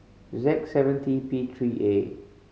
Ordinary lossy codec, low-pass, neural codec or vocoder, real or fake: none; none; none; real